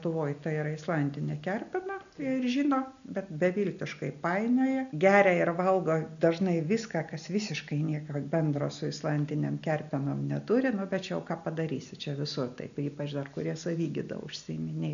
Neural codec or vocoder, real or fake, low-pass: none; real; 7.2 kHz